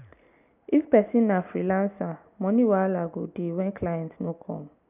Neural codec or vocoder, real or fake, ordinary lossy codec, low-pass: none; real; none; 3.6 kHz